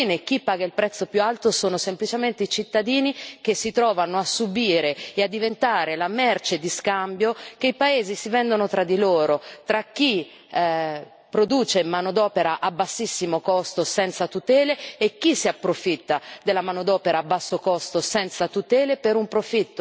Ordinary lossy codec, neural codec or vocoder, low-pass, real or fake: none; none; none; real